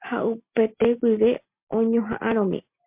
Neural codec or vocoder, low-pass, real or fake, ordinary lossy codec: none; 3.6 kHz; real; MP3, 32 kbps